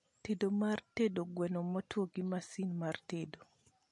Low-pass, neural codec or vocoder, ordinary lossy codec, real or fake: 10.8 kHz; none; MP3, 48 kbps; real